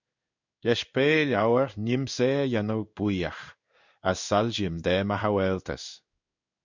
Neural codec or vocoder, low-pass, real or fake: codec, 16 kHz in and 24 kHz out, 1 kbps, XY-Tokenizer; 7.2 kHz; fake